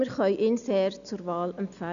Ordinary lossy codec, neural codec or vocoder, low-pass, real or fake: none; none; 7.2 kHz; real